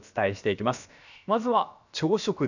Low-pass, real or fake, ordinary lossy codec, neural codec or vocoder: 7.2 kHz; fake; none; codec, 16 kHz, about 1 kbps, DyCAST, with the encoder's durations